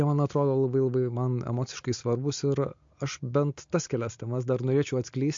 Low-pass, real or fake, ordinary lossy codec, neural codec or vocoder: 7.2 kHz; real; MP3, 48 kbps; none